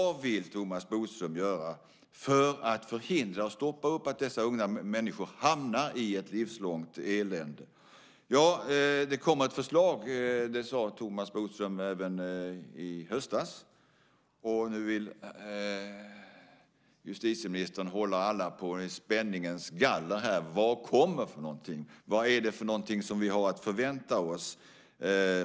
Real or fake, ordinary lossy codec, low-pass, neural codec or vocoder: real; none; none; none